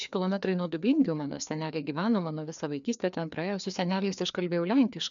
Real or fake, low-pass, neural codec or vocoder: fake; 7.2 kHz; codec, 16 kHz, 2 kbps, FreqCodec, larger model